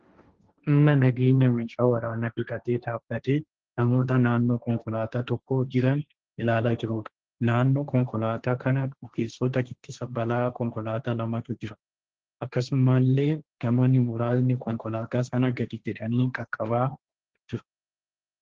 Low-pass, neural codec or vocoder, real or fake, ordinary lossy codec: 7.2 kHz; codec, 16 kHz, 1.1 kbps, Voila-Tokenizer; fake; Opus, 16 kbps